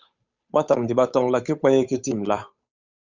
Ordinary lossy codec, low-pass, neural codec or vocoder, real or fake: Opus, 64 kbps; 7.2 kHz; codec, 16 kHz, 8 kbps, FunCodec, trained on Chinese and English, 25 frames a second; fake